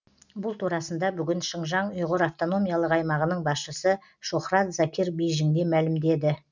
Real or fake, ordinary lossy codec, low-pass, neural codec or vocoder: real; none; 7.2 kHz; none